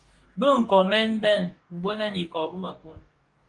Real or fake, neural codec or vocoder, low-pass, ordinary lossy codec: fake; codec, 44.1 kHz, 2.6 kbps, DAC; 10.8 kHz; Opus, 24 kbps